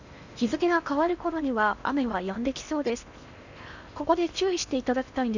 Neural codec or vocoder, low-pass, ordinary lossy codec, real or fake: codec, 16 kHz in and 24 kHz out, 0.8 kbps, FocalCodec, streaming, 65536 codes; 7.2 kHz; none; fake